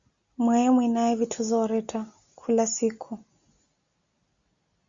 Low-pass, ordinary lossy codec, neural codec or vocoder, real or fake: 7.2 kHz; Opus, 64 kbps; none; real